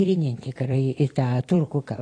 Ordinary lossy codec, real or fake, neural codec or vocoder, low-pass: AAC, 32 kbps; fake; vocoder, 44.1 kHz, 128 mel bands every 256 samples, BigVGAN v2; 9.9 kHz